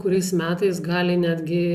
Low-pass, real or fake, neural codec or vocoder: 14.4 kHz; real; none